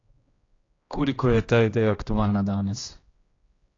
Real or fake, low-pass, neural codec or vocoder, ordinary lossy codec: fake; 7.2 kHz; codec, 16 kHz, 1 kbps, X-Codec, HuBERT features, trained on general audio; AAC, 32 kbps